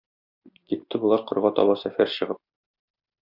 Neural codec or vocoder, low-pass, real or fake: none; 5.4 kHz; real